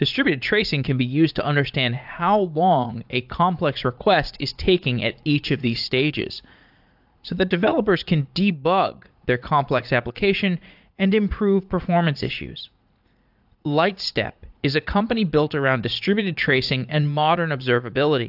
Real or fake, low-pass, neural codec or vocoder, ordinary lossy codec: fake; 5.4 kHz; vocoder, 44.1 kHz, 80 mel bands, Vocos; AAC, 48 kbps